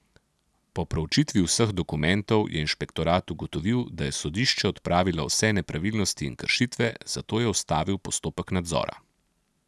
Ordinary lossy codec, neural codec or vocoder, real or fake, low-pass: none; none; real; none